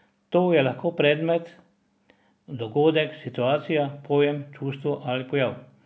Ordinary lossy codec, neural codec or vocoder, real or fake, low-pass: none; none; real; none